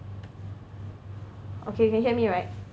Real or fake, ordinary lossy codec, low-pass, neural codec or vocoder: real; none; none; none